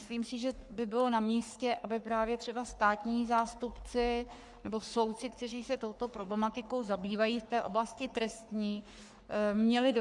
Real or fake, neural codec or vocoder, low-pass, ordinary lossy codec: fake; codec, 44.1 kHz, 3.4 kbps, Pupu-Codec; 10.8 kHz; Opus, 64 kbps